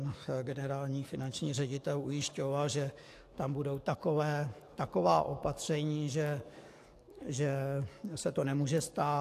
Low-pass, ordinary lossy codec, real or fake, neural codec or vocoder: 14.4 kHz; AAC, 64 kbps; fake; codec, 44.1 kHz, 7.8 kbps, DAC